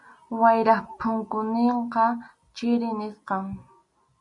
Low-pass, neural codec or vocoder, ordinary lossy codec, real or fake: 10.8 kHz; none; MP3, 64 kbps; real